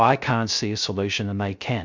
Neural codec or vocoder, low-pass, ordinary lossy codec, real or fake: codec, 16 kHz, 0.3 kbps, FocalCodec; 7.2 kHz; MP3, 64 kbps; fake